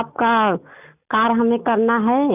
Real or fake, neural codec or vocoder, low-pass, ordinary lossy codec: real; none; 3.6 kHz; none